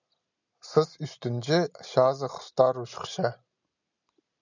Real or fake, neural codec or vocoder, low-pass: real; none; 7.2 kHz